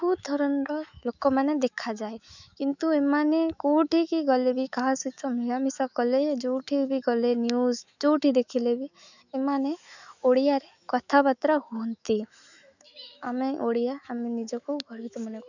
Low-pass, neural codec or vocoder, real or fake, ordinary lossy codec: 7.2 kHz; autoencoder, 48 kHz, 128 numbers a frame, DAC-VAE, trained on Japanese speech; fake; none